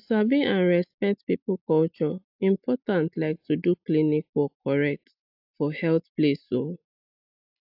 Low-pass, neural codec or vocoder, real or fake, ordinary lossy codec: 5.4 kHz; none; real; AAC, 48 kbps